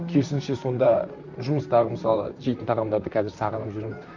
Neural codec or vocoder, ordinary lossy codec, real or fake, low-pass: vocoder, 44.1 kHz, 128 mel bands, Pupu-Vocoder; none; fake; 7.2 kHz